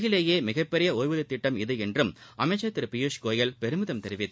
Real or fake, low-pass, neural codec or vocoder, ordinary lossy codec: real; none; none; none